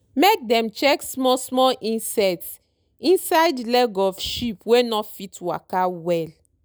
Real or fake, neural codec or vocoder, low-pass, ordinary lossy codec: real; none; none; none